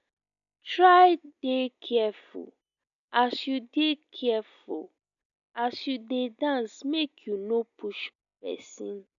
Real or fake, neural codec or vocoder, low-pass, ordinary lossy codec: real; none; 7.2 kHz; none